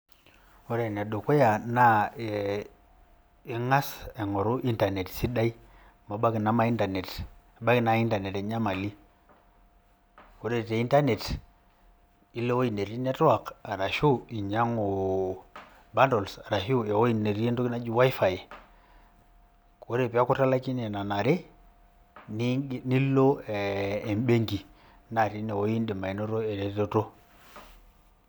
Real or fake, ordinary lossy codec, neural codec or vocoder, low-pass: real; none; none; none